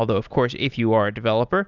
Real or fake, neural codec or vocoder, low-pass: real; none; 7.2 kHz